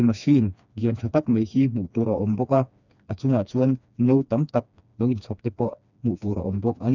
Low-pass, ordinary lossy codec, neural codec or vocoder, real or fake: 7.2 kHz; none; codec, 16 kHz, 2 kbps, FreqCodec, smaller model; fake